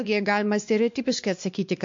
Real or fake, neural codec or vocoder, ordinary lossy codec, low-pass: fake; codec, 16 kHz, 2 kbps, X-Codec, WavLM features, trained on Multilingual LibriSpeech; MP3, 48 kbps; 7.2 kHz